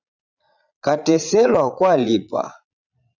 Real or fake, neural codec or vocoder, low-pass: fake; vocoder, 22.05 kHz, 80 mel bands, Vocos; 7.2 kHz